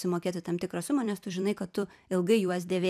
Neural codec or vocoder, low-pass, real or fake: vocoder, 44.1 kHz, 128 mel bands every 256 samples, BigVGAN v2; 14.4 kHz; fake